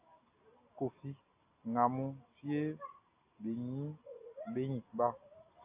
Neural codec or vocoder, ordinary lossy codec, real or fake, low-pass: none; AAC, 24 kbps; real; 3.6 kHz